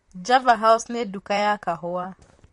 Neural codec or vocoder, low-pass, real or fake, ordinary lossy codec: vocoder, 44.1 kHz, 128 mel bands, Pupu-Vocoder; 19.8 kHz; fake; MP3, 48 kbps